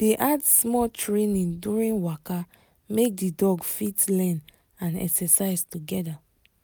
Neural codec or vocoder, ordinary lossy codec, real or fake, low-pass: none; none; real; none